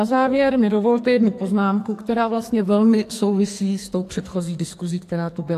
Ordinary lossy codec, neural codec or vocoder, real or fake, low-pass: AAC, 64 kbps; codec, 44.1 kHz, 2.6 kbps, SNAC; fake; 14.4 kHz